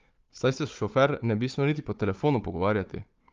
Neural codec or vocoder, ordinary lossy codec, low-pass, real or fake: codec, 16 kHz, 4 kbps, FunCodec, trained on Chinese and English, 50 frames a second; Opus, 32 kbps; 7.2 kHz; fake